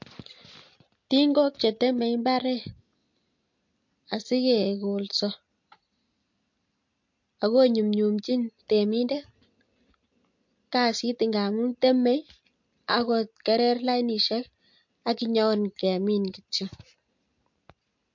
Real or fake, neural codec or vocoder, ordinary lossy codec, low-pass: real; none; MP3, 48 kbps; 7.2 kHz